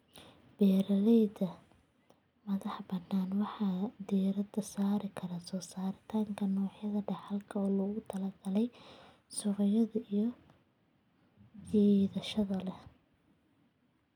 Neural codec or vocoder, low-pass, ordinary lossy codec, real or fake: none; 19.8 kHz; none; real